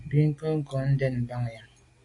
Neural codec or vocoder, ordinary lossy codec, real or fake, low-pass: none; AAC, 48 kbps; real; 10.8 kHz